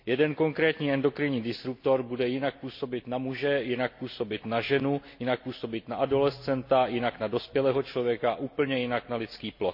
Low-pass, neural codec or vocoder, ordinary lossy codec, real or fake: 5.4 kHz; none; MP3, 32 kbps; real